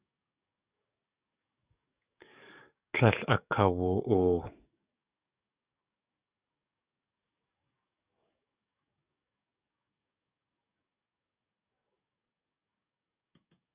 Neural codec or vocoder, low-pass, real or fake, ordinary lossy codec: codec, 44.1 kHz, 7.8 kbps, DAC; 3.6 kHz; fake; Opus, 64 kbps